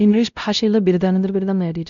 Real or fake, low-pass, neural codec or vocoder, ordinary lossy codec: fake; 7.2 kHz; codec, 16 kHz, 0.5 kbps, X-Codec, WavLM features, trained on Multilingual LibriSpeech; none